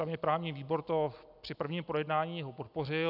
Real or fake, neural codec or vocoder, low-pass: real; none; 5.4 kHz